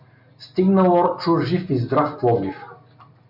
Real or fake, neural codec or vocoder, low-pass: real; none; 5.4 kHz